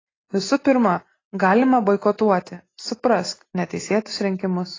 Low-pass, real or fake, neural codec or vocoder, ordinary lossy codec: 7.2 kHz; real; none; AAC, 32 kbps